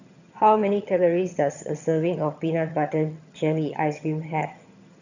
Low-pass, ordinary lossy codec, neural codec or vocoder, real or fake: 7.2 kHz; none; vocoder, 22.05 kHz, 80 mel bands, HiFi-GAN; fake